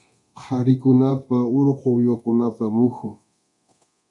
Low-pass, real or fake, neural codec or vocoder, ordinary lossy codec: 10.8 kHz; fake; codec, 24 kHz, 0.9 kbps, DualCodec; MP3, 64 kbps